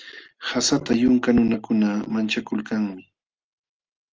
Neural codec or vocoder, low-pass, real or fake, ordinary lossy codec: none; 7.2 kHz; real; Opus, 24 kbps